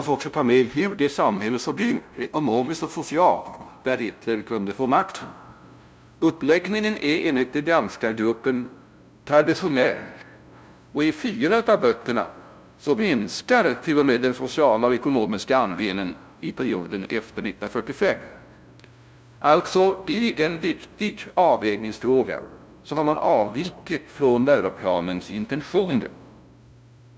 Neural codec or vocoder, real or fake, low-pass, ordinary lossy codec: codec, 16 kHz, 0.5 kbps, FunCodec, trained on LibriTTS, 25 frames a second; fake; none; none